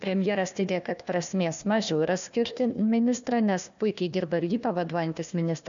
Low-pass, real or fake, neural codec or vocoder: 7.2 kHz; fake; codec, 16 kHz, 1 kbps, FunCodec, trained on Chinese and English, 50 frames a second